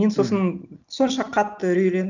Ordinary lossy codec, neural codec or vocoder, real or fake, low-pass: none; none; real; 7.2 kHz